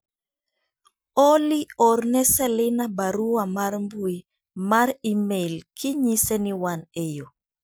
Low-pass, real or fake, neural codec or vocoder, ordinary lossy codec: none; real; none; none